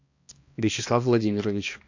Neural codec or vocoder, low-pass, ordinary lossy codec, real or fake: codec, 16 kHz, 1 kbps, X-Codec, HuBERT features, trained on balanced general audio; 7.2 kHz; AAC, 48 kbps; fake